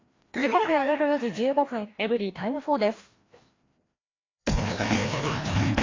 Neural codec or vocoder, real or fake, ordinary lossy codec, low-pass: codec, 16 kHz, 1 kbps, FreqCodec, larger model; fake; AAC, 32 kbps; 7.2 kHz